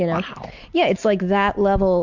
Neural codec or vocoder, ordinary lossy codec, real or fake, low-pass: none; MP3, 64 kbps; real; 7.2 kHz